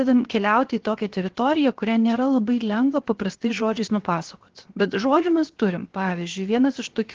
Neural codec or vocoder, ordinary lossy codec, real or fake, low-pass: codec, 16 kHz, 0.7 kbps, FocalCodec; Opus, 16 kbps; fake; 7.2 kHz